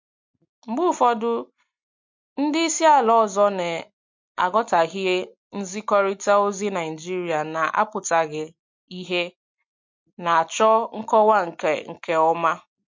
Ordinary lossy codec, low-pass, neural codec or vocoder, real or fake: MP3, 48 kbps; 7.2 kHz; none; real